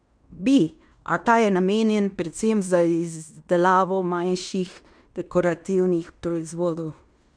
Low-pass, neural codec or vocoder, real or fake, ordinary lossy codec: 9.9 kHz; codec, 16 kHz in and 24 kHz out, 0.9 kbps, LongCat-Audio-Codec, fine tuned four codebook decoder; fake; none